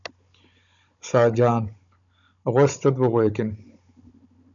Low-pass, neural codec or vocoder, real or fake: 7.2 kHz; codec, 16 kHz, 16 kbps, FunCodec, trained on Chinese and English, 50 frames a second; fake